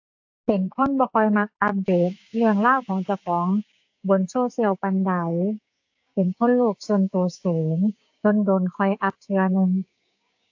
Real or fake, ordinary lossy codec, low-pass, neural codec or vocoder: fake; AAC, 48 kbps; 7.2 kHz; codec, 16 kHz, 6 kbps, DAC